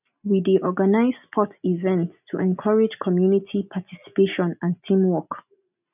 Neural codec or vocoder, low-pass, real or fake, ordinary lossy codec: none; 3.6 kHz; real; none